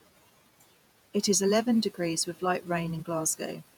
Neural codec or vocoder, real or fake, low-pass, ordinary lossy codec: vocoder, 48 kHz, 128 mel bands, Vocos; fake; none; none